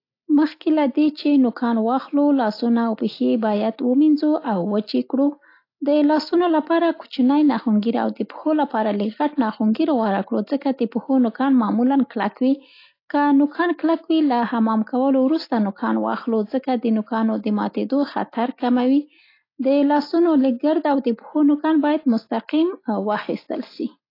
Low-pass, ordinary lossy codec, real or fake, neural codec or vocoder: 5.4 kHz; AAC, 32 kbps; real; none